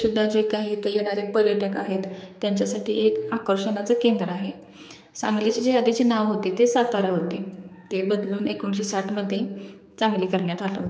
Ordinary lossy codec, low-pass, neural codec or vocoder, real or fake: none; none; codec, 16 kHz, 4 kbps, X-Codec, HuBERT features, trained on general audio; fake